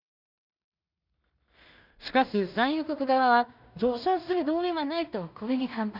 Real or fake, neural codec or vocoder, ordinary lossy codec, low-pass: fake; codec, 16 kHz in and 24 kHz out, 0.4 kbps, LongCat-Audio-Codec, two codebook decoder; none; 5.4 kHz